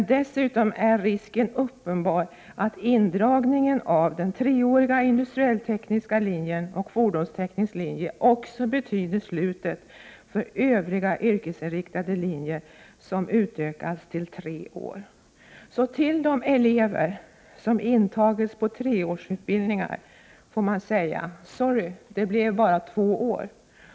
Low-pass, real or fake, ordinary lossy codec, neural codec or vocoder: none; real; none; none